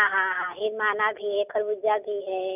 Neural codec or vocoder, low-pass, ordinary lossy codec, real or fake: none; 3.6 kHz; none; real